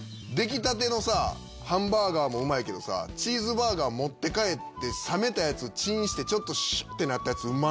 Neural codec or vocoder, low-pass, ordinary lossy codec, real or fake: none; none; none; real